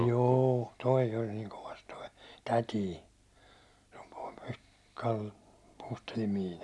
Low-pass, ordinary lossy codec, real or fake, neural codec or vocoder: none; none; real; none